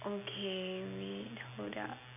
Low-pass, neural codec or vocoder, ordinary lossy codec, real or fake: 3.6 kHz; none; none; real